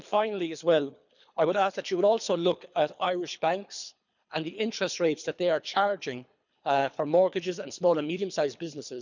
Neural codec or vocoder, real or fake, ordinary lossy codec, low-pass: codec, 24 kHz, 3 kbps, HILCodec; fake; none; 7.2 kHz